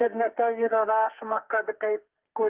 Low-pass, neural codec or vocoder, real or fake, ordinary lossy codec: 3.6 kHz; codec, 44.1 kHz, 2.6 kbps, SNAC; fake; Opus, 24 kbps